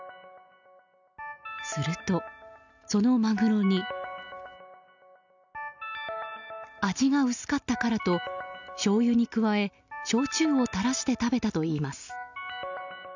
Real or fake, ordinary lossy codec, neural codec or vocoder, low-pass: real; none; none; 7.2 kHz